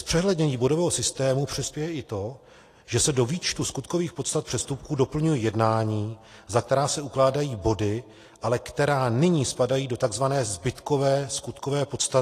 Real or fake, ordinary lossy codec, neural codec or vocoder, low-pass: real; AAC, 48 kbps; none; 14.4 kHz